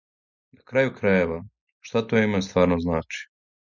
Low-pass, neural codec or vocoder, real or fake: 7.2 kHz; none; real